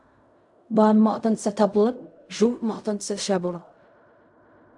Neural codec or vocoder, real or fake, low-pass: codec, 16 kHz in and 24 kHz out, 0.4 kbps, LongCat-Audio-Codec, fine tuned four codebook decoder; fake; 10.8 kHz